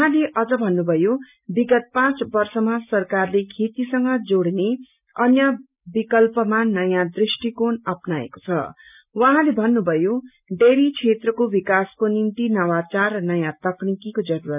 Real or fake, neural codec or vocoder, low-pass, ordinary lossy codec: real; none; 3.6 kHz; none